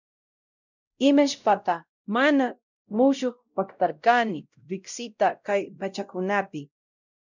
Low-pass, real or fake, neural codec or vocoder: 7.2 kHz; fake; codec, 16 kHz, 0.5 kbps, X-Codec, WavLM features, trained on Multilingual LibriSpeech